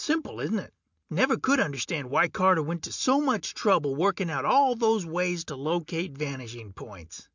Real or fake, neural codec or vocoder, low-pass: real; none; 7.2 kHz